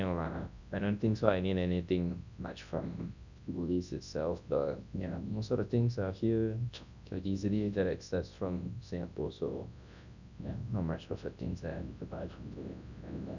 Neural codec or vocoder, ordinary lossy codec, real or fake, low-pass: codec, 24 kHz, 0.9 kbps, WavTokenizer, large speech release; none; fake; 7.2 kHz